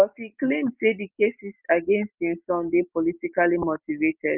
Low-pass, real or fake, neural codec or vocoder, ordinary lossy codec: 3.6 kHz; real; none; Opus, 32 kbps